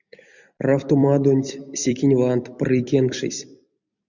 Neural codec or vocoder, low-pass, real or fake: vocoder, 44.1 kHz, 128 mel bands every 256 samples, BigVGAN v2; 7.2 kHz; fake